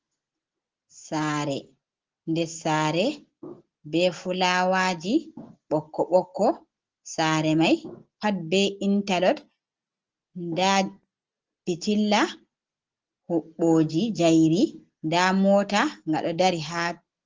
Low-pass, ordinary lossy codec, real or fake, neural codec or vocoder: 7.2 kHz; Opus, 16 kbps; real; none